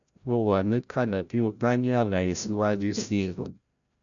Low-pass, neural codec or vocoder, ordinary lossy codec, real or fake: 7.2 kHz; codec, 16 kHz, 0.5 kbps, FreqCodec, larger model; none; fake